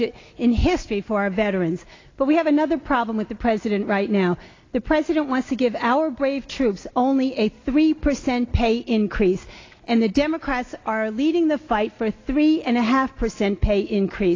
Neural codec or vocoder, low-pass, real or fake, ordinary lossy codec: none; 7.2 kHz; real; AAC, 32 kbps